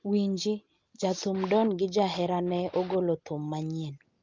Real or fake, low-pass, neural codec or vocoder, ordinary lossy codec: real; 7.2 kHz; none; Opus, 24 kbps